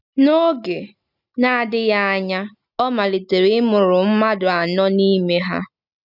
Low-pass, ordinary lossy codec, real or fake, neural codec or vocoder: 5.4 kHz; none; real; none